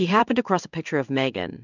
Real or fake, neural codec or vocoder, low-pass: fake; codec, 16 kHz in and 24 kHz out, 1 kbps, XY-Tokenizer; 7.2 kHz